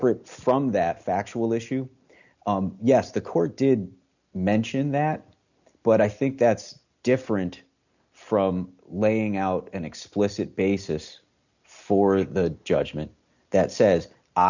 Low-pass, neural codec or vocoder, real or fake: 7.2 kHz; none; real